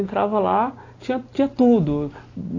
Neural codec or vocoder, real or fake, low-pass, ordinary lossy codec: none; real; 7.2 kHz; AAC, 32 kbps